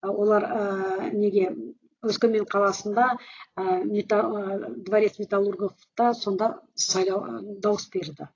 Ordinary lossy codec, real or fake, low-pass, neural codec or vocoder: AAC, 32 kbps; real; 7.2 kHz; none